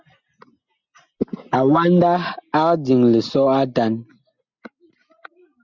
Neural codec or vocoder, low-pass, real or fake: none; 7.2 kHz; real